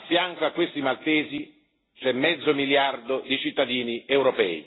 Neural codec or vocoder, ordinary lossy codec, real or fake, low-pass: none; AAC, 16 kbps; real; 7.2 kHz